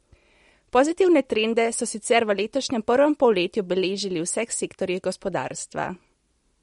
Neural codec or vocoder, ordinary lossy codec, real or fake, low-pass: none; MP3, 48 kbps; real; 19.8 kHz